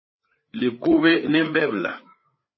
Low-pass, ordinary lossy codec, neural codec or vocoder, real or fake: 7.2 kHz; MP3, 24 kbps; codec, 16 kHz, 4 kbps, FreqCodec, larger model; fake